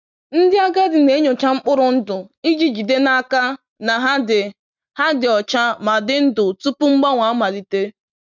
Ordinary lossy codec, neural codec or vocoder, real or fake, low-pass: none; none; real; 7.2 kHz